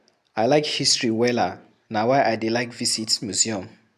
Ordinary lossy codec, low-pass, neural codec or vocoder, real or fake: none; 14.4 kHz; none; real